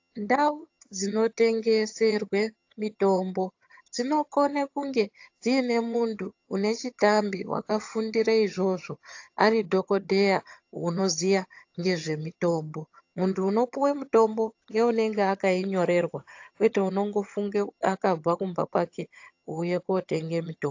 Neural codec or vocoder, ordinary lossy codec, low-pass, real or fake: vocoder, 22.05 kHz, 80 mel bands, HiFi-GAN; AAC, 48 kbps; 7.2 kHz; fake